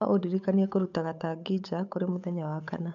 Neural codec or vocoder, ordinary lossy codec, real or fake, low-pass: codec, 16 kHz, 8 kbps, FunCodec, trained on Chinese and English, 25 frames a second; none; fake; 7.2 kHz